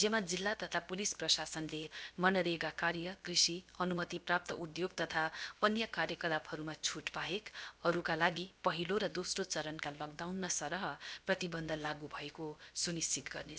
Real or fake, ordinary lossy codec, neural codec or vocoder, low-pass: fake; none; codec, 16 kHz, about 1 kbps, DyCAST, with the encoder's durations; none